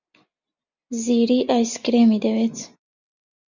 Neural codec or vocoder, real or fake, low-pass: none; real; 7.2 kHz